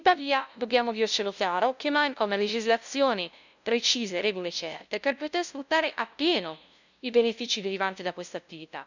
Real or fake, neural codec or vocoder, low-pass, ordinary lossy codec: fake; codec, 16 kHz, 0.5 kbps, FunCodec, trained on LibriTTS, 25 frames a second; 7.2 kHz; none